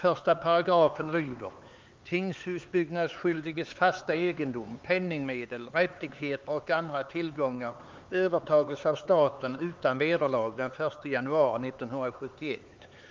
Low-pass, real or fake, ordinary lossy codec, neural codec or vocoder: 7.2 kHz; fake; Opus, 32 kbps; codec, 16 kHz, 4 kbps, X-Codec, HuBERT features, trained on LibriSpeech